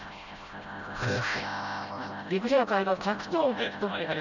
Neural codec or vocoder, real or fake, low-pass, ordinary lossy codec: codec, 16 kHz, 0.5 kbps, FreqCodec, smaller model; fake; 7.2 kHz; none